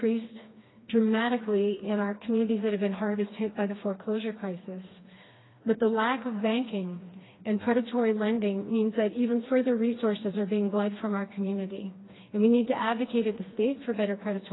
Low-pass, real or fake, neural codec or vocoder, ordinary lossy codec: 7.2 kHz; fake; codec, 16 kHz, 2 kbps, FreqCodec, smaller model; AAC, 16 kbps